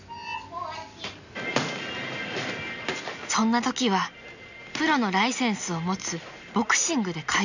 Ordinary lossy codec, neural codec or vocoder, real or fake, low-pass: none; none; real; 7.2 kHz